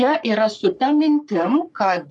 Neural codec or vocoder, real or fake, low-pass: codec, 44.1 kHz, 3.4 kbps, Pupu-Codec; fake; 10.8 kHz